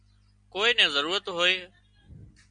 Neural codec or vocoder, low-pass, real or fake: none; 9.9 kHz; real